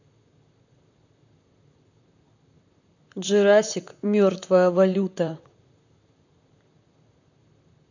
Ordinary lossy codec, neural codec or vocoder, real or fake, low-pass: none; vocoder, 44.1 kHz, 128 mel bands, Pupu-Vocoder; fake; 7.2 kHz